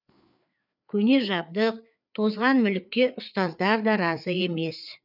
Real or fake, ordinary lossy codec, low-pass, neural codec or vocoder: fake; none; 5.4 kHz; codec, 16 kHz, 4 kbps, FreqCodec, larger model